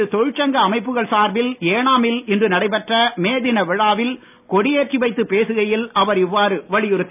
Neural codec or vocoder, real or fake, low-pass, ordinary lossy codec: none; real; 3.6 kHz; none